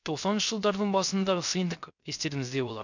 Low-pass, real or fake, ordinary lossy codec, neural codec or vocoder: 7.2 kHz; fake; none; codec, 16 kHz, 0.3 kbps, FocalCodec